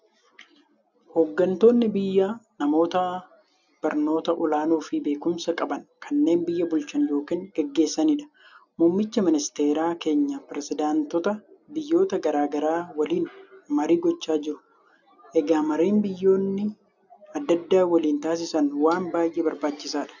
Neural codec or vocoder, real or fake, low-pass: none; real; 7.2 kHz